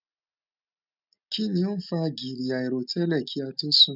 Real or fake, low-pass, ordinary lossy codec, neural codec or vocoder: real; 5.4 kHz; none; none